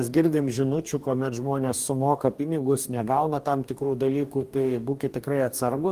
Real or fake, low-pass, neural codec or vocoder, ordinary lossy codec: fake; 14.4 kHz; codec, 44.1 kHz, 2.6 kbps, DAC; Opus, 24 kbps